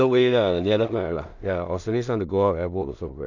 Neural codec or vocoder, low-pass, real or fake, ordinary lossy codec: codec, 16 kHz in and 24 kHz out, 0.4 kbps, LongCat-Audio-Codec, two codebook decoder; 7.2 kHz; fake; none